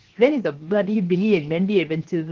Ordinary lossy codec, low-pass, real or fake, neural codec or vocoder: Opus, 16 kbps; 7.2 kHz; fake; codec, 16 kHz, 0.7 kbps, FocalCodec